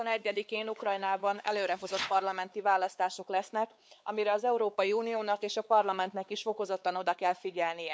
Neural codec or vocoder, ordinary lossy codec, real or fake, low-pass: codec, 16 kHz, 4 kbps, X-Codec, WavLM features, trained on Multilingual LibriSpeech; none; fake; none